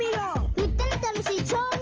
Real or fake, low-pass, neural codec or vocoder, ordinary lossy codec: real; 7.2 kHz; none; Opus, 24 kbps